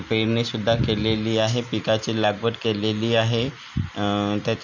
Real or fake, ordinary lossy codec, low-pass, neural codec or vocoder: real; none; 7.2 kHz; none